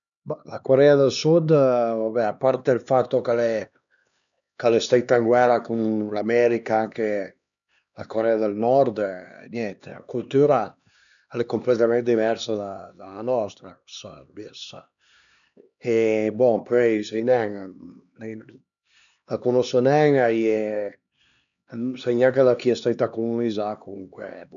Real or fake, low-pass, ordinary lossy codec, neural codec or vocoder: fake; 7.2 kHz; none; codec, 16 kHz, 2 kbps, X-Codec, HuBERT features, trained on LibriSpeech